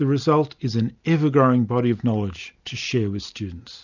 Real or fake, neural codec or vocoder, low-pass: real; none; 7.2 kHz